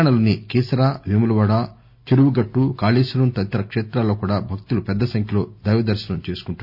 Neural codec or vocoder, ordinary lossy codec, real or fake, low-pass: none; none; real; 5.4 kHz